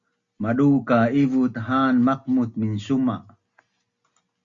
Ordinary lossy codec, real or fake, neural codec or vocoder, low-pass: Opus, 64 kbps; real; none; 7.2 kHz